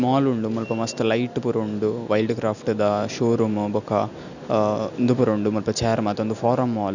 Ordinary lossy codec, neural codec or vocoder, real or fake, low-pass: none; none; real; 7.2 kHz